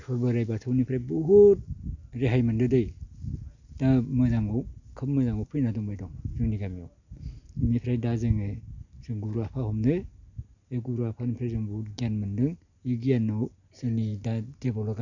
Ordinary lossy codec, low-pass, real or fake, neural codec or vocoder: none; 7.2 kHz; real; none